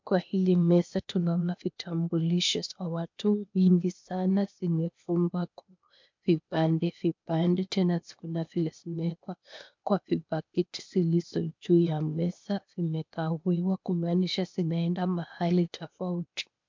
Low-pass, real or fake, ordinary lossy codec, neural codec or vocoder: 7.2 kHz; fake; MP3, 64 kbps; codec, 16 kHz, 0.8 kbps, ZipCodec